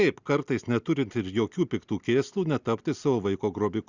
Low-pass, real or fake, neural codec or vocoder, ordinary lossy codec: 7.2 kHz; real; none; Opus, 64 kbps